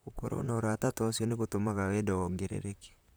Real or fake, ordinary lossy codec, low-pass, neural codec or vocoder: fake; none; none; vocoder, 44.1 kHz, 128 mel bands, Pupu-Vocoder